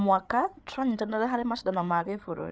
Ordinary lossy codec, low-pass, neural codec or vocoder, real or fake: none; none; codec, 16 kHz, 8 kbps, FreqCodec, larger model; fake